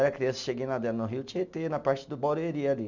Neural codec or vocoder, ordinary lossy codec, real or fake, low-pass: none; none; real; 7.2 kHz